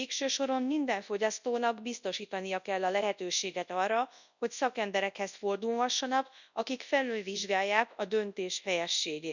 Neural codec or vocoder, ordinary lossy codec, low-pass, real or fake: codec, 24 kHz, 0.9 kbps, WavTokenizer, large speech release; none; 7.2 kHz; fake